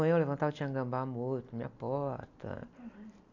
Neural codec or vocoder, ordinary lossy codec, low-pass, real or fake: none; none; 7.2 kHz; real